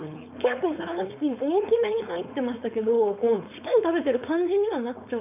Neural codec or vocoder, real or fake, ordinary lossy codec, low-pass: codec, 16 kHz, 4.8 kbps, FACodec; fake; none; 3.6 kHz